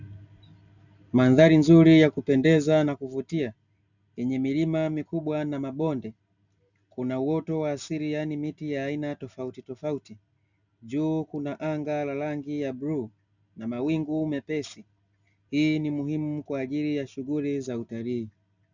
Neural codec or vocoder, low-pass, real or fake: none; 7.2 kHz; real